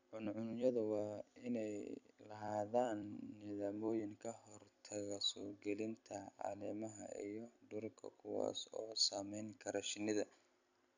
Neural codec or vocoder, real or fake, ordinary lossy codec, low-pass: vocoder, 44.1 kHz, 128 mel bands every 256 samples, BigVGAN v2; fake; none; 7.2 kHz